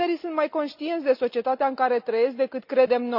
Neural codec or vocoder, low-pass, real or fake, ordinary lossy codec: none; 5.4 kHz; real; none